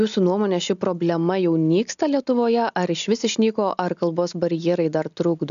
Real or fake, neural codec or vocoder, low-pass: real; none; 7.2 kHz